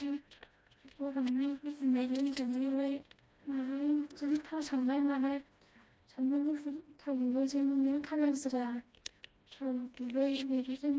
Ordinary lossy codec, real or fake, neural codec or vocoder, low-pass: none; fake; codec, 16 kHz, 1 kbps, FreqCodec, smaller model; none